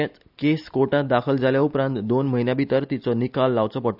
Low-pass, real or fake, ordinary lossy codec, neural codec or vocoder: 5.4 kHz; real; none; none